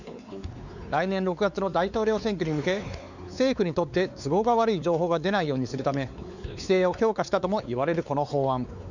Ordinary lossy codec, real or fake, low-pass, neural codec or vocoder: none; fake; 7.2 kHz; codec, 16 kHz, 4 kbps, X-Codec, WavLM features, trained on Multilingual LibriSpeech